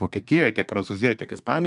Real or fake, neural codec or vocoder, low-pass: fake; codec, 24 kHz, 1 kbps, SNAC; 10.8 kHz